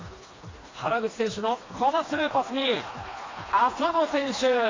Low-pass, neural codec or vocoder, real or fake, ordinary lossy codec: 7.2 kHz; codec, 16 kHz, 2 kbps, FreqCodec, smaller model; fake; AAC, 32 kbps